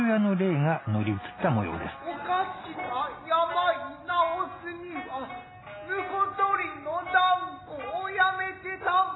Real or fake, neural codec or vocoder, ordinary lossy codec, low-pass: real; none; AAC, 16 kbps; 7.2 kHz